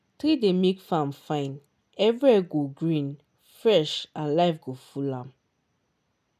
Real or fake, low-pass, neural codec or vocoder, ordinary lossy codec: real; 14.4 kHz; none; none